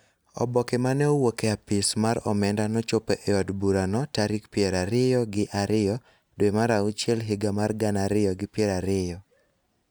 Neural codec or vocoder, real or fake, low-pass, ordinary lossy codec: none; real; none; none